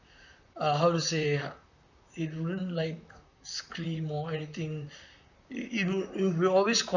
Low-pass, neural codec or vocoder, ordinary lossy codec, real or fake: 7.2 kHz; vocoder, 44.1 kHz, 128 mel bands, Pupu-Vocoder; none; fake